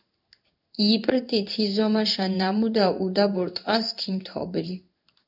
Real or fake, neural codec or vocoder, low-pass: fake; codec, 16 kHz in and 24 kHz out, 1 kbps, XY-Tokenizer; 5.4 kHz